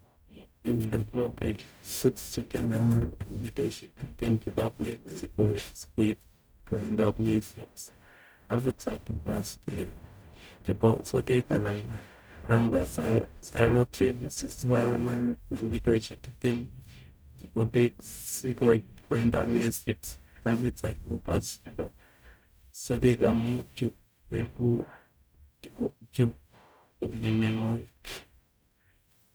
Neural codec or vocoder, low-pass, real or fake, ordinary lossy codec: codec, 44.1 kHz, 0.9 kbps, DAC; none; fake; none